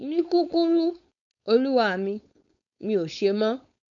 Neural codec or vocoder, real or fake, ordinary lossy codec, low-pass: codec, 16 kHz, 4.8 kbps, FACodec; fake; none; 7.2 kHz